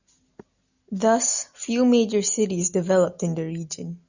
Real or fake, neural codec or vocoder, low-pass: real; none; 7.2 kHz